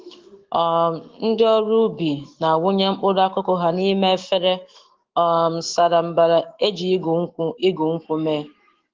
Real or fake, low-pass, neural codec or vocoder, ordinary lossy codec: fake; 7.2 kHz; autoencoder, 48 kHz, 128 numbers a frame, DAC-VAE, trained on Japanese speech; Opus, 16 kbps